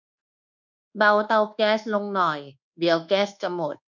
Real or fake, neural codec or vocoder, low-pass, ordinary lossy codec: fake; codec, 24 kHz, 1.2 kbps, DualCodec; 7.2 kHz; none